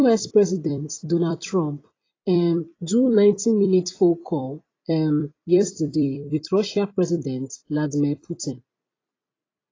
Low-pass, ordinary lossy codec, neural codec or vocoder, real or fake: 7.2 kHz; AAC, 32 kbps; vocoder, 44.1 kHz, 128 mel bands every 256 samples, BigVGAN v2; fake